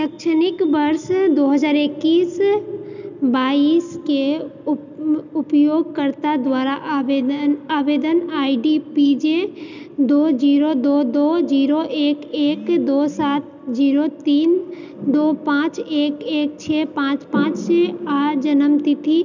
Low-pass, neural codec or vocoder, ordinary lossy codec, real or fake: 7.2 kHz; none; none; real